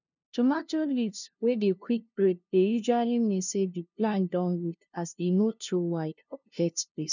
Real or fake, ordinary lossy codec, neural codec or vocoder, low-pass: fake; none; codec, 16 kHz, 0.5 kbps, FunCodec, trained on LibriTTS, 25 frames a second; 7.2 kHz